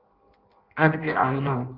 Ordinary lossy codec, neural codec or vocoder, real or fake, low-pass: Opus, 24 kbps; codec, 16 kHz in and 24 kHz out, 0.6 kbps, FireRedTTS-2 codec; fake; 5.4 kHz